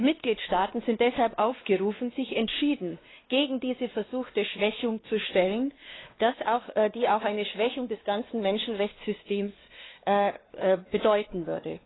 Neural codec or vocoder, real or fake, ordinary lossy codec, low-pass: codec, 16 kHz, 2 kbps, X-Codec, WavLM features, trained on Multilingual LibriSpeech; fake; AAC, 16 kbps; 7.2 kHz